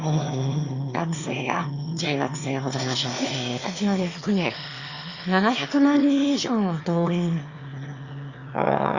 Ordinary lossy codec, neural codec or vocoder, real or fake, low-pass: Opus, 64 kbps; autoencoder, 22.05 kHz, a latent of 192 numbers a frame, VITS, trained on one speaker; fake; 7.2 kHz